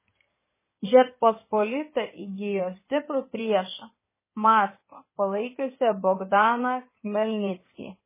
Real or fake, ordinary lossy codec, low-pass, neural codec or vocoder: fake; MP3, 16 kbps; 3.6 kHz; codec, 16 kHz in and 24 kHz out, 2.2 kbps, FireRedTTS-2 codec